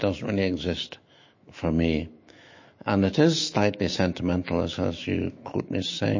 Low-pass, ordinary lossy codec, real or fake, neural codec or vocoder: 7.2 kHz; MP3, 32 kbps; fake; autoencoder, 48 kHz, 128 numbers a frame, DAC-VAE, trained on Japanese speech